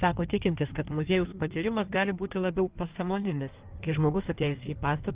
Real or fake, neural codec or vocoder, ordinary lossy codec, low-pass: fake; codec, 16 kHz in and 24 kHz out, 1.1 kbps, FireRedTTS-2 codec; Opus, 24 kbps; 3.6 kHz